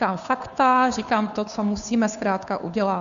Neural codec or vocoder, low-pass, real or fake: codec, 16 kHz, 2 kbps, FunCodec, trained on Chinese and English, 25 frames a second; 7.2 kHz; fake